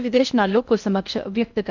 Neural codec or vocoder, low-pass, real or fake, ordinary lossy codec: codec, 16 kHz in and 24 kHz out, 0.6 kbps, FocalCodec, streaming, 4096 codes; 7.2 kHz; fake; none